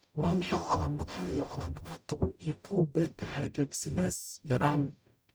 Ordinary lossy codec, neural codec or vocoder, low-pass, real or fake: none; codec, 44.1 kHz, 0.9 kbps, DAC; none; fake